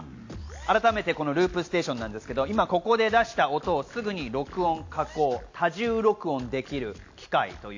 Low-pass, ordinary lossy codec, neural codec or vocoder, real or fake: 7.2 kHz; AAC, 48 kbps; none; real